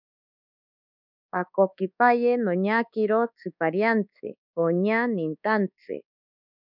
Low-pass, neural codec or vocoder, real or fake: 5.4 kHz; codec, 24 kHz, 1.2 kbps, DualCodec; fake